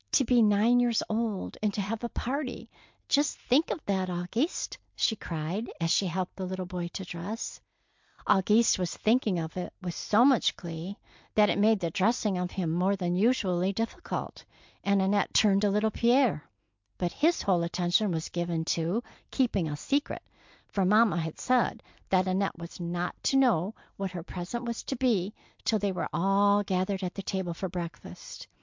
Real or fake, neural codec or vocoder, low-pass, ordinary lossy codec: real; none; 7.2 kHz; MP3, 64 kbps